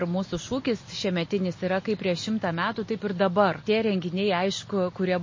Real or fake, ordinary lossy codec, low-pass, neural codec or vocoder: real; MP3, 32 kbps; 7.2 kHz; none